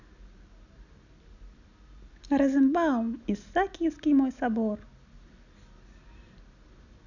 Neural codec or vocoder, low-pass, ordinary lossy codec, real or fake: none; 7.2 kHz; none; real